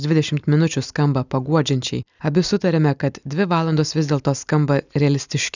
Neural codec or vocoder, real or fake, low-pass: none; real; 7.2 kHz